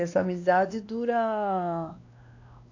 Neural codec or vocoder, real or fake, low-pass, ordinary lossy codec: codec, 16 kHz, 2 kbps, X-Codec, WavLM features, trained on Multilingual LibriSpeech; fake; 7.2 kHz; AAC, 48 kbps